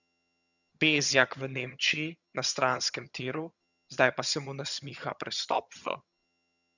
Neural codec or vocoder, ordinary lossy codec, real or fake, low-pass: vocoder, 22.05 kHz, 80 mel bands, HiFi-GAN; none; fake; 7.2 kHz